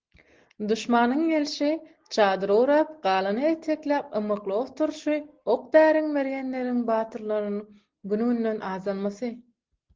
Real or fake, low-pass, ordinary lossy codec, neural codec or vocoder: real; 7.2 kHz; Opus, 16 kbps; none